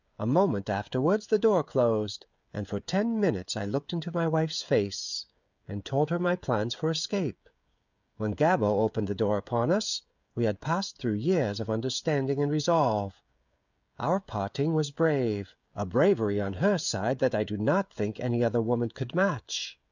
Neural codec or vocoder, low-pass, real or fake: codec, 16 kHz, 16 kbps, FreqCodec, smaller model; 7.2 kHz; fake